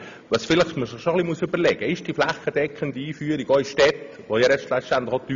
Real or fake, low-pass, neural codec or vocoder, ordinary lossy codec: real; 7.2 kHz; none; MP3, 64 kbps